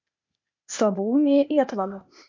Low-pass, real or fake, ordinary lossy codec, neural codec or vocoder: 7.2 kHz; fake; MP3, 48 kbps; codec, 16 kHz, 0.8 kbps, ZipCodec